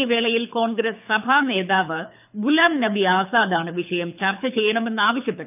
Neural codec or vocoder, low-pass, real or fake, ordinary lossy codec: codec, 24 kHz, 6 kbps, HILCodec; 3.6 kHz; fake; none